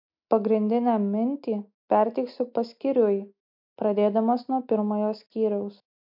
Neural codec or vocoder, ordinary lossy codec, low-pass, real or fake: none; MP3, 48 kbps; 5.4 kHz; real